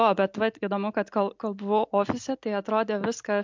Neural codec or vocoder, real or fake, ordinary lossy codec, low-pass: none; real; MP3, 64 kbps; 7.2 kHz